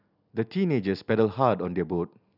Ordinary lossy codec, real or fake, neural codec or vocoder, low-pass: none; real; none; 5.4 kHz